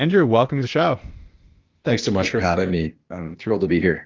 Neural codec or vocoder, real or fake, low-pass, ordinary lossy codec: codec, 16 kHz, 0.8 kbps, ZipCodec; fake; 7.2 kHz; Opus, 32 kbps